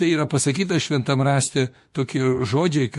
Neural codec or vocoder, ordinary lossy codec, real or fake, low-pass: autoencoder, 48 kHz, 32 numbers a frame, DAC-VAE, trained on Japanese speech; MP3, 48 kbps; fake; 14.4 kHz